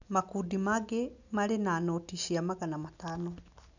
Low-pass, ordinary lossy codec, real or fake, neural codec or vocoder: 7.2 kHz; none; real; none